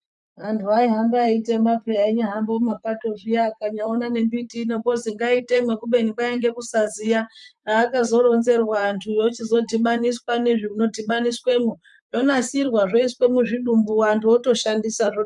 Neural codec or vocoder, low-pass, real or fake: vocoder, 44.1 kHz, 128 mel bands, Pupu-Vocoder; 10.8 kHz; fake